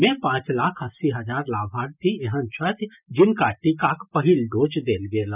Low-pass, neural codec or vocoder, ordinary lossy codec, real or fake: 3.6 kHz; none; none; real